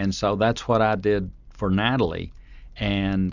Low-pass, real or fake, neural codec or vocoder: 7.2 kHz; real; none